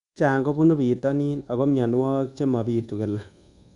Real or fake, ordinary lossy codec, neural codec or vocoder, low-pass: fake; none; codec, 24 kHz, 1.2 kbps, DualCodec; 10.8 kHz